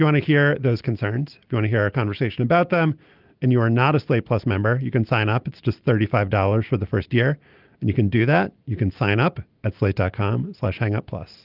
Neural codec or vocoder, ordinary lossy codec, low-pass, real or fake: none; Opus, 32 kbps; 5.4 kHz; real